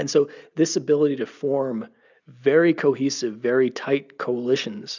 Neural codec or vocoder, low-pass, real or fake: none; 7.2 kHz; real